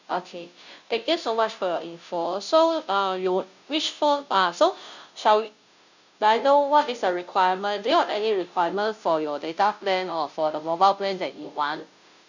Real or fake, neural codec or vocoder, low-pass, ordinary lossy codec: fake; codec, 16 kHz, 0.5 kbps, FunCodec, trained on Chinese and English, 25 frames a second; 7.2 kHz; none